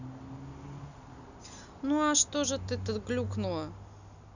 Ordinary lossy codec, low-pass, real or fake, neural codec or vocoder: none; 7.2 kHz; real; none